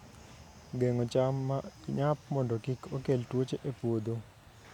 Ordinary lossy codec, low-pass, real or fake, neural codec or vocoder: none; 19.8 kHz; real; none